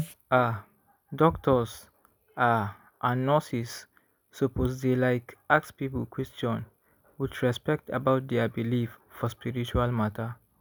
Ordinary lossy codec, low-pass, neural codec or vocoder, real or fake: none; none; none; real